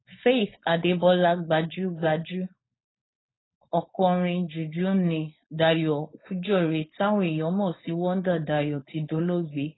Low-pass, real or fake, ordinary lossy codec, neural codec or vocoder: 7.2 kHz; fake; AAC, 16 kbps; codec, 16 kHz, 4.8 kbps, FACodec